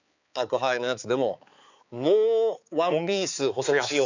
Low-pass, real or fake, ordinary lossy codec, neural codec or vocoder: 7.2 kHz; fake; none; codec, 16 kHz, 4 kbps, X-Codec, HuBERT features, trained on balanced general audio